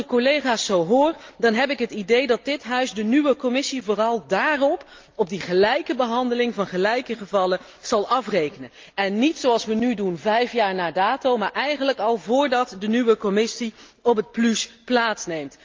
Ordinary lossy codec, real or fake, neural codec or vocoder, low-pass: Opus, 24 kbps; real; none; 7.2 kHz